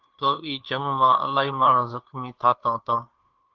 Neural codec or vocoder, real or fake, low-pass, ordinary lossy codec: codec, 16 kHz, 2 kbps, FunCodec, trained on Chinese and English, 25 frames a second; fake; 7.2 kHz; Opus, 32 kbps